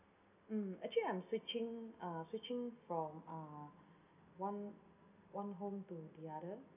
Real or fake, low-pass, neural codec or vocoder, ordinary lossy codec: real; 3.6 kHz; none; none